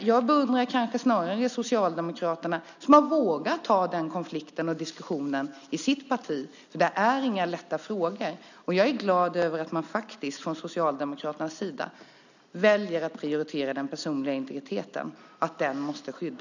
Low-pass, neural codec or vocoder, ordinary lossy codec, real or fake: 7.2 kHz; none; none; real